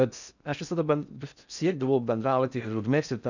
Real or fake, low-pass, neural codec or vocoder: fake; 7.2 kHz; codec, 16 kHz in and 24 kHz out, 0.6 kbps, FocalCodec, streaming, 4096 codes